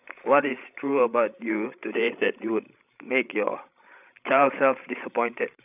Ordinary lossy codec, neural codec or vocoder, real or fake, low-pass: none; codec, 16 kHz, 16 kbps, FreqCodec, larger model; fake; 3.6 kHz